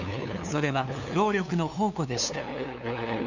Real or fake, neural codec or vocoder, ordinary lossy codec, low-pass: fake; codec, 16 kHz, 2 kbps, FunCodec, trained on LibriTTS, 25 frames a second; none; 7.2 kHz